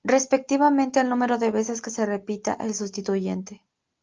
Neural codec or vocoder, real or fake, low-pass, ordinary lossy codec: none; real; 7.2 kHz; Opus, 24 kbps